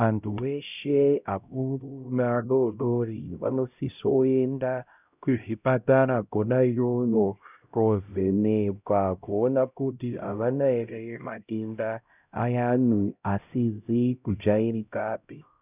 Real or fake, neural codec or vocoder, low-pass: fake; codec, 16 kHz, 0.5 kbps, X-Codec, HuBERT features, trained on LibriSpeech; 3.6 kHz